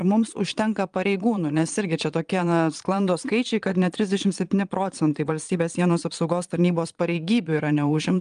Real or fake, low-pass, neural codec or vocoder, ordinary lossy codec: fake; 9.9 kHz; vocoder, 22.05 kHz, 80 mel bands, Vocos; Opus, 32 kbps